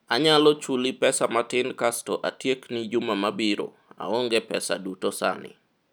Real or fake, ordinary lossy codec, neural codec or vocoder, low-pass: real; none; none; none